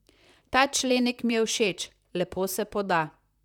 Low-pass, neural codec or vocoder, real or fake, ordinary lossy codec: 19.8 kHz; vocoder, 44.1 kHz, 128 mel bands, Pupu-Vocoder; fake; none